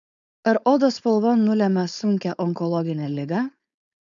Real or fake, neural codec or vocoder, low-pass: fake; codec, 16 kHz, 4.8 kbps, FACodec; 7.2 kHz